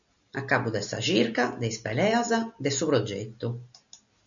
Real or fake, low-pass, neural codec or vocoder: real; 7.2 kHz; none